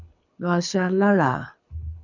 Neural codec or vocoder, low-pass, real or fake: codec, 24 kHz, 6 kbps, HILCodec; 7.2 kHz; fake